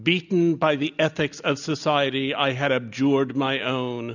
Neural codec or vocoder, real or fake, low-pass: none; real; 7.2 kHz